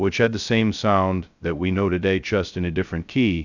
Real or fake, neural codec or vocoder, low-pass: fake; codec, 16 kHz, 0.2 kbps, FocalCodec; 7.2 kHz